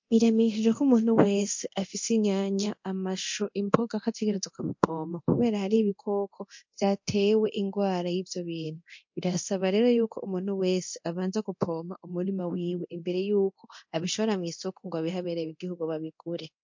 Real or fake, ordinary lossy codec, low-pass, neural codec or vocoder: fake; MP3, 48 kbps; 7.2 kHz; codec, 24 kHz, 0.9 kbps, DualCodec